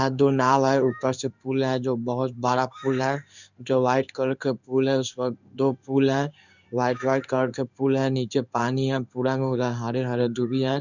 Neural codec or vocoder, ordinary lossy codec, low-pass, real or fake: codec, 16 kHz in and 24 kHz out, 1 kbps, XY-Tokenizer; none; 7.2 kHz; fake